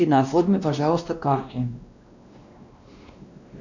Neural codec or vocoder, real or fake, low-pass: codec, 16 kHz, 1 kbps, X-Codec, WavLM features, trained on Multilingual LibriSpeech; fake; 7.2 kHz